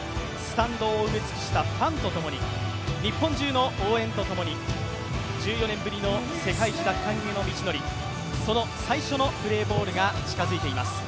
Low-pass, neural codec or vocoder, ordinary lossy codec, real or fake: none; none; none; real